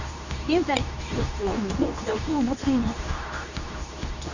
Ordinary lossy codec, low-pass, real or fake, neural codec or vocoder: none; 7.2 kHz; fake; codec, 24 kHz, 0.9 kbps, WavTokenizer, medium speech release version 2